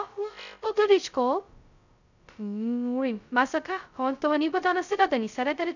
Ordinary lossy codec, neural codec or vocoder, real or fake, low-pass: none; codec, 16 kHz, 0.2 kbps, FocalCodec; fake; 7.2 kHz